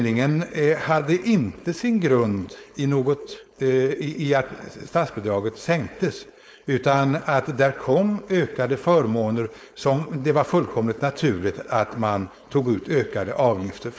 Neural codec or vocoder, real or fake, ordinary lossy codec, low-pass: codec, 16 kHz, 4.8 kbps, FACodec; fake; none; none